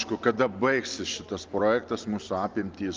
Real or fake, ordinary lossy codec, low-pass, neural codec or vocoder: real; Opus, 24 kbps; 7.2 kHz; none